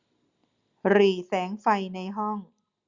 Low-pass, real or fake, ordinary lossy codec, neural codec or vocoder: 7.2 kHz; real; Opus, 64 kbps; none